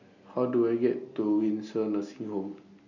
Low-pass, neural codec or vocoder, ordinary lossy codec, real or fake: 7.2 kHz; none; none; real